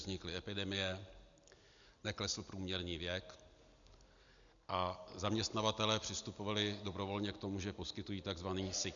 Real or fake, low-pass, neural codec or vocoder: real; 7.2 kHz; none